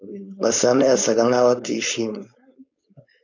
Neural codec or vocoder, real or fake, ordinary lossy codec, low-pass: codec, 16 kHz, 4.8 kbps, FACodec; fake; AAC, 48 kbps; 7.2 kHz